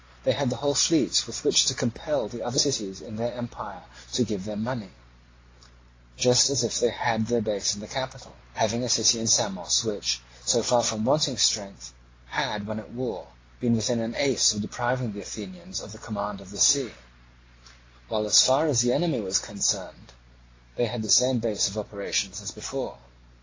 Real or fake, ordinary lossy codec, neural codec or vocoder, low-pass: real; AAC, 32 kbps; none; 7.2 kHz